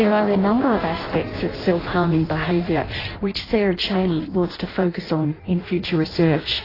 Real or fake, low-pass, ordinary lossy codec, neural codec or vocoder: fake; 5.4 kHz; AAC, 24 kbps; codec, 16 kHz in and 24 kHz out, 0.6 kbps, FireRedTTS-2 codec